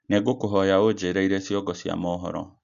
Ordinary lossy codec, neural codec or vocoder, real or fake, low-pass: none; none; real; 7.2 kHz